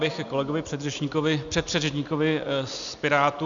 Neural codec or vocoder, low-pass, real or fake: none; 7.2 kHz; real